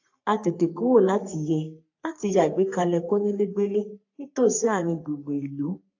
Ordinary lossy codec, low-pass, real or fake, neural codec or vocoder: AAC, 48 kbps; 7.2 kHz; fake; codec, 44.1 kHz, 3.4 kbps, Pupu-Codec